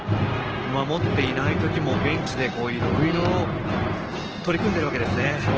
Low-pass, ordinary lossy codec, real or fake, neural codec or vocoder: 7.2 kHz; Opus, 16 kbps; real; none